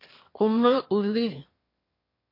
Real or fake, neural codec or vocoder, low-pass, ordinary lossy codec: fake; autoencoder, 22.05 kHz, a latent of 192 numbers a frame, VITS, trained on one speaker; 5.4 kHz; MP3, 32 kbps